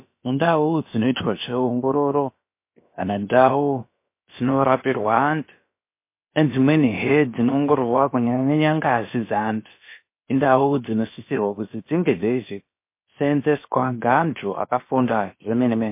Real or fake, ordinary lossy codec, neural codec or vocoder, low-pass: fake; MP3, 24 kbps; codec, 16 kHz, about 1 kbps, DyCAST, with the encoder's durations; 3.6 kHz